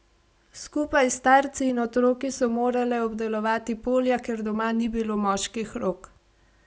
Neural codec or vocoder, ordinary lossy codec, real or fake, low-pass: none; none; real; none